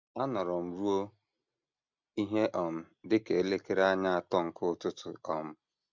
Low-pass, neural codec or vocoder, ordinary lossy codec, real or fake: 7.2 kHz; none; MP3, 64 kbps; real